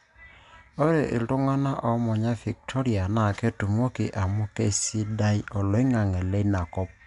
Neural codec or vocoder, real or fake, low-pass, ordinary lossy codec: none; real; 10.8 kHz; none